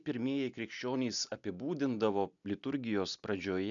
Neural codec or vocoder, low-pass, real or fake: none; 7.2 kHz; real